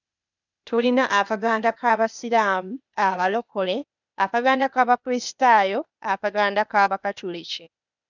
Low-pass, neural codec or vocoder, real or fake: 7.2 kHz; codec, 16 kHz, 0.8 kbps, ZipCodec; fake